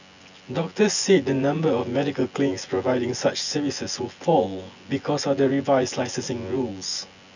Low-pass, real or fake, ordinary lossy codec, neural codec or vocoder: 7.2 kHz; fake; none; vocoder, 24 kHz, 100 mel bands, Vocos